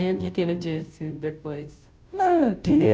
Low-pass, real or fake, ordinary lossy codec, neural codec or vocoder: none; fake; none; codec, 16 kHz, 0.5 kbps, FunCodec, trained on Chinese and English, 25 frames a second